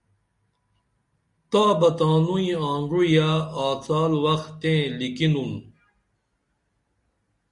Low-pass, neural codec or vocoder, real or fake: 10.8 kHz; none; real